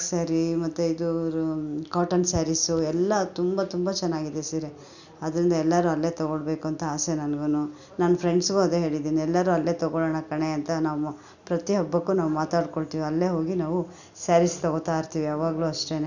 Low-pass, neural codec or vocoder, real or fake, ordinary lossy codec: 7.2 kHz; none; real; none